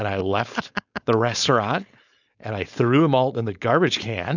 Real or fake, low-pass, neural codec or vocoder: fake; 7.2 kHz; codec, 16 kHz, 4.8 kbps, FACodec